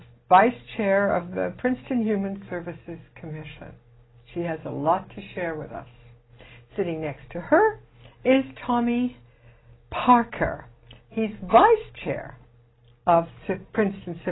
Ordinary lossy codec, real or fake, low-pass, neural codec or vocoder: AAC, 16 kbps; real; 7.2 kHz; none